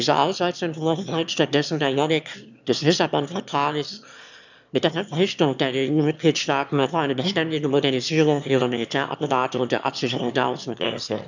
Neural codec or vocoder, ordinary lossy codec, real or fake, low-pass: autoencoder, 22.05 kHz, a latent of 192 numbers a frame, VITS, trained on one speaker; none; fake; 7.2 kHz